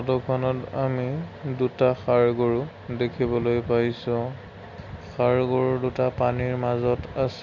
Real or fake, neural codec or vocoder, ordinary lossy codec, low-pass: real; none; Opus, 64 kbps; 7.2 kHz